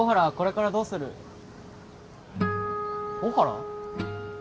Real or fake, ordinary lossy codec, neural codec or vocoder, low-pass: real; none; none; none